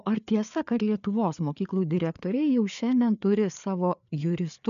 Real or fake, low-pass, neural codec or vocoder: fake; 7.2 kHz; codec, 16 kHz, 4 kbps, FreqCodec, larger model